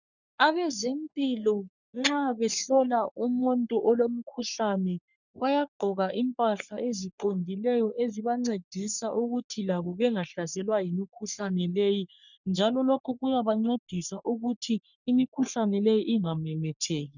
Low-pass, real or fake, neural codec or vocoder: 7.2 kHz; fake; codec, 44.1 kHz, 3.4 kbps, Pupu-Codec